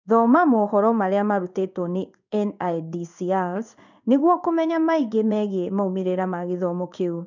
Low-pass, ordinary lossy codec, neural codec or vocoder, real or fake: 7.2 kHz; none; codec, 16 kHz in and 24 kHz out, 1 kbps, XY-Tokenizer; fake